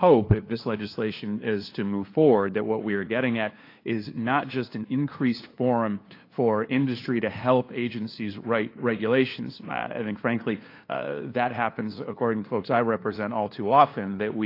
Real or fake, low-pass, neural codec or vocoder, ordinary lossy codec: fake; 5.4 kHz; codec, 16 kHz, 2 kbps, FunCodec, trained on LibriTTS, 25 frames a second; AAC, 32 kbps